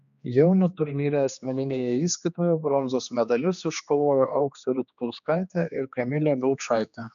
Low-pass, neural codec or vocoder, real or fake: 7.2 kHz; codec, 16 kHz, 2 kbps, X-Codec, HuBERT features, trained on general audio; fake